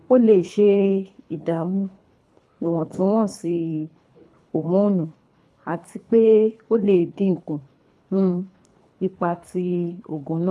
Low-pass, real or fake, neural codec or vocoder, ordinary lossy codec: none; fake; codec, 24 kHz, 3 kbps, HILCodec; none